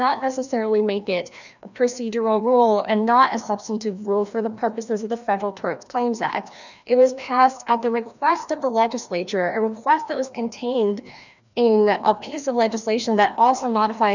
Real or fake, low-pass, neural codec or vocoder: fake; 7.2 kHz; codec, 16 kHz, 1 kbps, FreqCodec, larger model